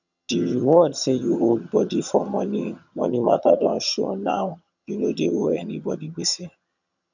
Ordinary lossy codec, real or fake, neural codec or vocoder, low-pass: none; fake; vocoder, 22.05 kHz, 80 mel bands, HiFi-GAN; 7.2 kHz